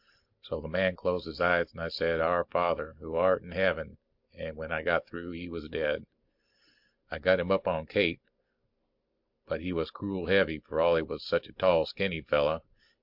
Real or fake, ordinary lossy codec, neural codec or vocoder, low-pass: real; MP3, 48 kbps; none; 5.4 kHz